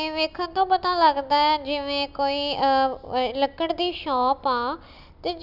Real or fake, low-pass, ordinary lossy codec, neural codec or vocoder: real; 5.4 kHz; none; none